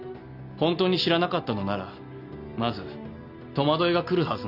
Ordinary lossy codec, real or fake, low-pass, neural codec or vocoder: none; real; 5.4 kHz; none